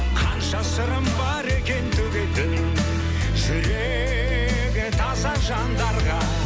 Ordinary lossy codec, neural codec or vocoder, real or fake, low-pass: none; none; real; none